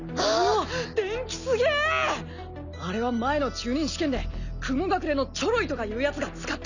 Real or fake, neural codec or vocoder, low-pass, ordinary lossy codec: real; none; 7.2 kHz; none